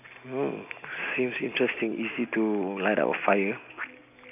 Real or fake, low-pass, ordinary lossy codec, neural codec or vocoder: real; 3.6 kHz; none; none